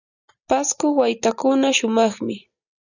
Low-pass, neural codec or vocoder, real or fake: 7.2 kHz; none; real